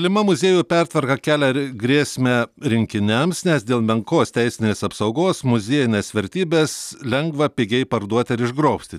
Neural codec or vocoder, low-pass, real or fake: none; 14.4 kHz; real